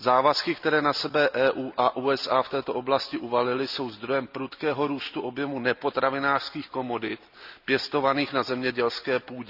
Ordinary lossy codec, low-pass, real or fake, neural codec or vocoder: none; 5.4 kHz; real; none